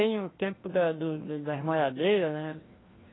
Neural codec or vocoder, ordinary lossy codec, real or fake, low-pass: codec, 16 kHz, 1 kbps, FreqCodec, larger model; AAC, 16 kbps; fake; 7.2 kHz